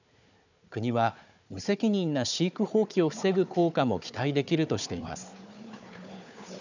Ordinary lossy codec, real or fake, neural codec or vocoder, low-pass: none; fake; codec, 16 kHz, 4 kbps, FunCodec, trained on Chinese and English, 50 frames a second; 7.2 kHz